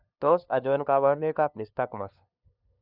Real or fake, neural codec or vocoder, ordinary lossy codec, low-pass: fake; codec, 16 kHz, 2 kbps, FunCodec, trained on LibriTTS, 25 frames a second; none; 5.4 kHz